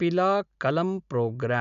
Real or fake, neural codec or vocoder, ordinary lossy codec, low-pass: real; none; none; 7.2 kHz